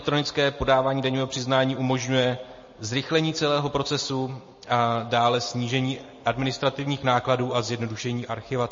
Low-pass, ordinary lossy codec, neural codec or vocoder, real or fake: 7.2 kHz; MP3, 32 kbps; none; real